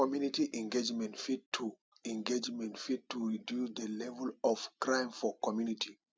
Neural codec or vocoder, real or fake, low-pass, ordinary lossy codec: none; real; none; none